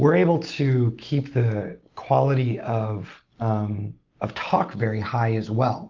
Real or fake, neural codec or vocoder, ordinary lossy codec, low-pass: real; none; Opus, 24 kbps; 7.2 kHz